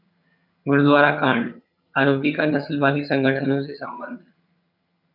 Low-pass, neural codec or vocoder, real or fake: 5.4 kHz; vocoder, 22.05 kHz, 80 mel bands, HiFi-GAN; fake